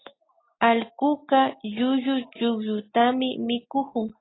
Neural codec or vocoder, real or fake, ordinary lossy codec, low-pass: none; real; AAC, 16 kbps; 7.2 kHz